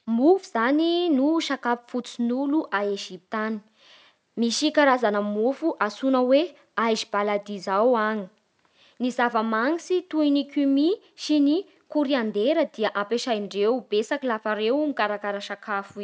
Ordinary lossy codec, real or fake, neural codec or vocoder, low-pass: none; real; none; none